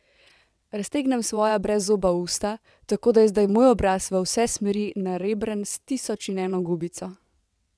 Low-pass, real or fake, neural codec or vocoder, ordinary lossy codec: none; fake; vocoder, 22.05 kHz, 80 mel bands, WaveNeXt; none